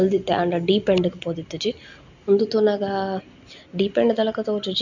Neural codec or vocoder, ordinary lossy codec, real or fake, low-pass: none; none; real; 7.2 kHz